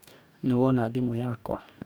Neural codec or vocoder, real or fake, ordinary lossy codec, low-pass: codec, 44.1 kHz, 2.6 kbps, DAC; fake; none; none